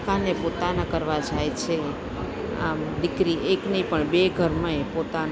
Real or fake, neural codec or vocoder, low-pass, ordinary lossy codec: real; none; none; none